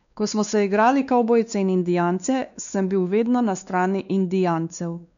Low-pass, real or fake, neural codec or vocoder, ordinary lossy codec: 7.2 kHz; fake; codec, 16 kHz, 2 kbps, X-Codec, WavLM features, trained on Multilingual LibriSpeech; none